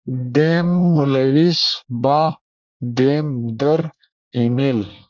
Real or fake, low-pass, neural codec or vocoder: fake; 7.2 kHz; codec, 24 kHz, 1 kbps, SNAC